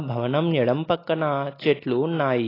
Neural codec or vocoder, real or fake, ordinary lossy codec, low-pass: none; real; AAC, 24 kbps; 5.4 kHz